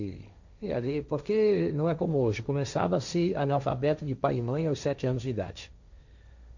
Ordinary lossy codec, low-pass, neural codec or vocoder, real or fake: none; 7.2 kHz; codec, 16 kHz, 1.1 kbps, Voila-Tokenizer; fake